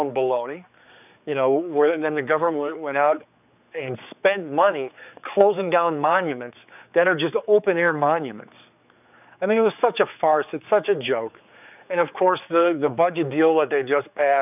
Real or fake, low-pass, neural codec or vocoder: fake; 3.6 kHz; codec, 16 kHz, 4 kbps, X-Codec, HuBERT features, trained on general audio